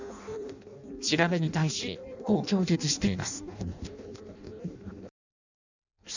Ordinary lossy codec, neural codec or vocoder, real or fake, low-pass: none; codec, 16 kHz in and 24 kHz out, 0.6 kbps, FireRedTTS-2 codec; fake; 7.2 kHz